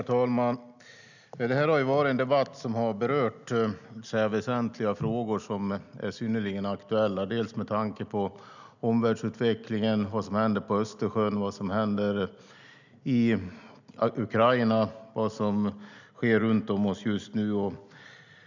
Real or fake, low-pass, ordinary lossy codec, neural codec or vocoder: real; 7.2 kHz; none; none